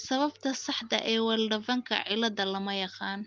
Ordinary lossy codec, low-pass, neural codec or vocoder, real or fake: Opus, 32 kbps; 7.2 kHz; none; real